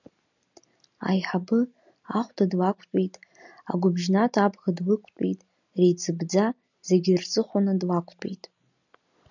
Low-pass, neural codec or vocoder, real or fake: 7.2 kHz; none; real